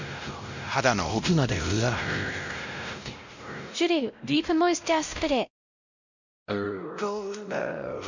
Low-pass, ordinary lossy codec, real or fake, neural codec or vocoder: 7.2 kHz; none; fake; codec, 16 kHz, 0.5 kbps, X-Codec, WavLM features, trained on Multilingual LibriSpeech